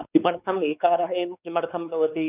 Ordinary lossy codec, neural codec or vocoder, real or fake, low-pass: none; codec, 16 kHz, 4 kbps, X-Codec, WavLM features, trained on Multilingual LibriSpeech; fake; 3.6 kHz